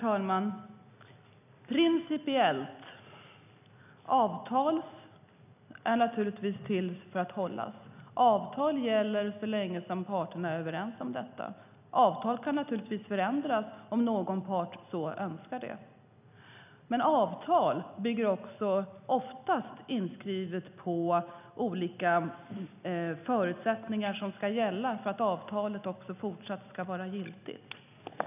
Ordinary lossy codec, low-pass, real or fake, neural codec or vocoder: none; 3.6 kHz; real; none